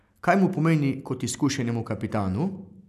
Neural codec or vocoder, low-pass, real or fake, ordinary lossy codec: none; 14.4 kHz; real; none